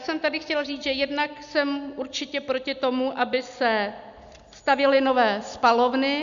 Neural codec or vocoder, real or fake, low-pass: none; real; 7.2 kHz